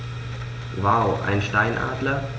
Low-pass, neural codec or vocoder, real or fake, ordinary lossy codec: none; none; real; none